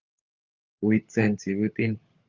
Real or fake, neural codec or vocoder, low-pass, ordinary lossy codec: fake; codec, 16 kHz, 16 kbps, FunCodec, trained on LibriTTS, 50 frames a second; 7.2 kHz; Opus, 24 kbps